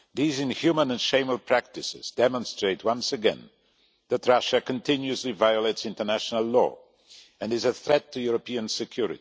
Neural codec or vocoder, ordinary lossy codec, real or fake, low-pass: none; none; real; none